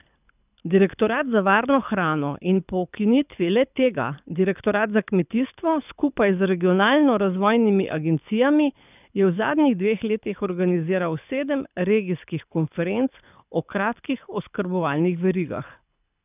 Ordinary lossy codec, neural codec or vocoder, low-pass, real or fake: none; codec, 24 kHz, 6 kbps, HILCodec; 3.6 kHz; fake